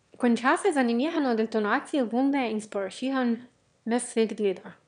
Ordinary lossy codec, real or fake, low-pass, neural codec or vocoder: none; fake; 9.9 kHz; autoencoder, 22.05 kHz, a latent of 192 numbers a frame, VITS, trained on one speaker